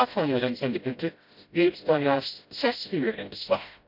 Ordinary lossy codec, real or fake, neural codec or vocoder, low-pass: none; fake; codec, 16 kHz, 0.5 kbps, FreqCodec, smaller model; 5.4 kHz